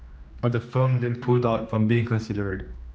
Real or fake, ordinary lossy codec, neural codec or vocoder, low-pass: fake; none; codec, 16 kHz, 2 kbps, X-Codec, HuBERT features, trained on general audio; none